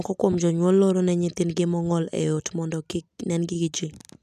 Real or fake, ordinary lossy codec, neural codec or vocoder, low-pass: real; none; none; 14.4 kHz